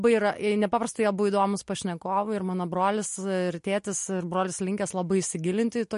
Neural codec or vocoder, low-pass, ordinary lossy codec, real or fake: none; 10.8 kHz; MP3, 48 kbps; real